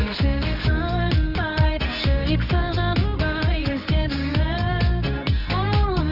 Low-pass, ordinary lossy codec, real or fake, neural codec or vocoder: 5.4 kHz; Opus, 24 kbps; fake; codec, 24 kHz, 0.9 kbps, WavTokenizer, medium music audio release